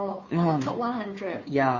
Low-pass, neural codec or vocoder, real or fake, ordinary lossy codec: 7.2 kHz; codec, 16 kHz, 2 kbps, FunCodec, trained on Chinese and English, 25 frames a second; fake; MP3, 32 kbps